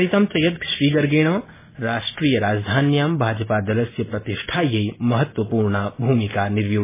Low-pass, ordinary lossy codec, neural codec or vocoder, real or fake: 3.6 kHz; MP3, 16 kbps; none; real